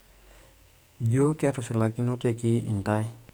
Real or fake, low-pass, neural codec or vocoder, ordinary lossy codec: fake; none; codec, 44.1 kHz, 2.6 kbps, SNAC; none